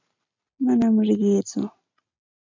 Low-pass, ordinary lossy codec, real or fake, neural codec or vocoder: 7.2 kHz; MP3, 64 kbps; real; none